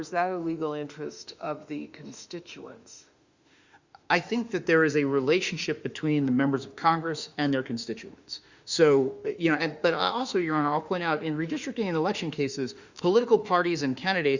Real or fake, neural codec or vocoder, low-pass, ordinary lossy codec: fake; autoencoder, 48 kHz, 32 numbers a frame, DAC-VAE, trained on Japanese speech; 7.2 kHz; Opus, 64 kbps